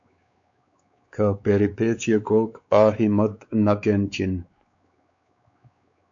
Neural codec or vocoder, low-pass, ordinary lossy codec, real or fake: codec, 16 kHz, 4 kbps, X-Codec, WavLM features, trained on Multilingual LibriSpeech; 7.2 kHz; AAC, 64 kbps; fake